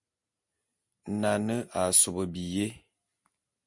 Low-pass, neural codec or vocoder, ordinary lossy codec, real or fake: 10.8 kHz; none; MP3, 64 kbps; real